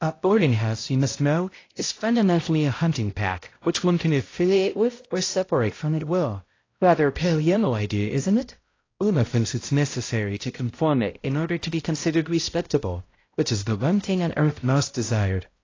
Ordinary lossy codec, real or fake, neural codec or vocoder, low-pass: AAC, 32 kbps; fake; codec, 16 kHz, 0.5 kbps, X-Codec, HuBERT features, trained on balanced general audio; 7.2 kHz